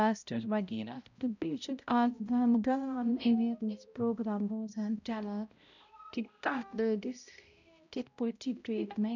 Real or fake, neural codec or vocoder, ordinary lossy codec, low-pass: fake; codec, 16 kHz, 0.5 kbps, X-Codec, HuBERT features, trained on balanced general audio; none; 7.2 kHz